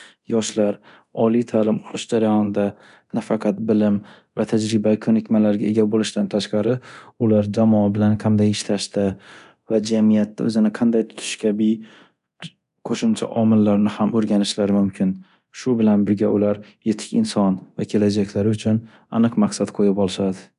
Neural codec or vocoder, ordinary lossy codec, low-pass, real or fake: codec, 24 kHz, 0.9 kbps, DualCodec; none; 10.8 kHz; fake